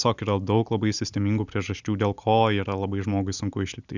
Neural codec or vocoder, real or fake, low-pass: none; real; 7.2 kHz